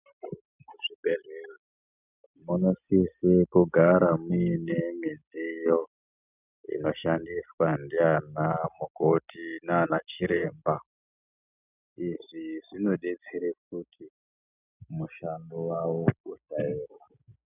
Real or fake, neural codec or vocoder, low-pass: real; none; 3.6 kHz